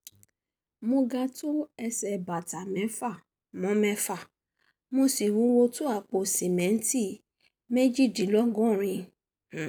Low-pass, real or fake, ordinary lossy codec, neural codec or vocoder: none; real; none; none